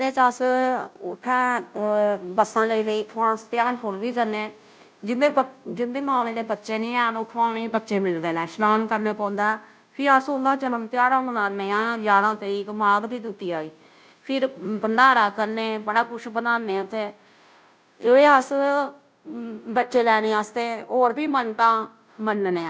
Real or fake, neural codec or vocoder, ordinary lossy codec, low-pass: fake; codec, 16 kHz, 0.5 kbps, FunCodec, trained on Chinese and English, 25 frames a second; none; none